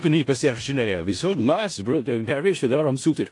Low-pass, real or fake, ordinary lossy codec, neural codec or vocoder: 10.8 kHz; fake; AAC, 48 kbps; codec, 16 kHz in and 24 kHz out, 0.4 kbps, LongCat-Audio-Codec, four codebook decoder